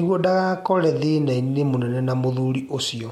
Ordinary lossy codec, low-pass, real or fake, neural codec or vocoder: MP3, 64 kbps; 19.8 kHz; real; none